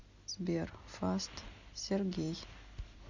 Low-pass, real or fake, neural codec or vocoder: 7.2 kHz; real; none